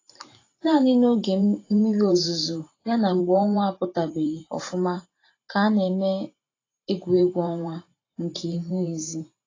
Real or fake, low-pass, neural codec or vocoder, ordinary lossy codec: fake; 7.2 kHz; vocoder, 44.1 kHz, 128 mel bands every 512 samples, BigVGAN v2; AAC, 32 kbps